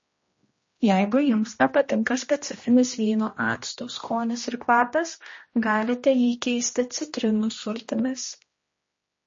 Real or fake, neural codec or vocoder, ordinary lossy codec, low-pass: fake; codec, 16 kHz, 1 kbps, X-Codec, HuBERT features, trained on general audio; MP3, 32 kbps; 7.2 kHz